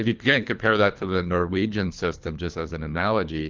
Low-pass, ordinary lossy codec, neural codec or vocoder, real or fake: 7.2 kHz; Opus, 32 kbps; codec, 24 kHz, 3 kbps, HILCodec; fake